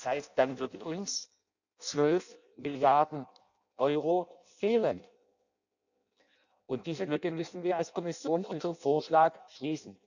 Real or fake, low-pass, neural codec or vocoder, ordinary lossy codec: fake; 7.2 kHz; codec, 16 kHz in and 24 kHz out, 0.6 kbps, FireRedTTS-2 codec; none